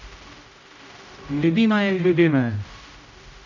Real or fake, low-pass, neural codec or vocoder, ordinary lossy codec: fake; 7.2 kHz; codec, 16 kHz, 0.5 kbps, X-Codec, HuBERT features, trained on general audio; none